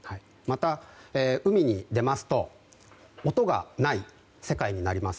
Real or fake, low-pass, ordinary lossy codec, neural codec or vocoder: real; none; none; none